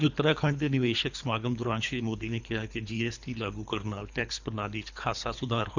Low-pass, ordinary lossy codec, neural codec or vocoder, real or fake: 7.2 kHz; Opus, 64 kbps; codec, 24 kHz, 3 kbps, HILCodec; fake